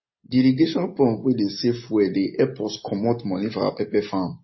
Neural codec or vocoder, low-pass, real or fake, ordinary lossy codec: none; 7.2 kHz; real; MP3, 24 kbps